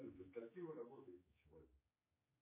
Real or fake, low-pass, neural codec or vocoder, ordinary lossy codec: fake; 3.6 kHz; codec, 16 kHz, 4 kbps, X-Codec, HuBERT features, trained on general audio; MP3, 16 kbps